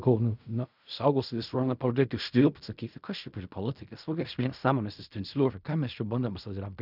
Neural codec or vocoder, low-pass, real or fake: codec, 16 kHz in and 24 kHz out, 0.4 kbps, LongCat-Audio-Codec, fine tuned four codebook decoder; 5.4 kHz; fake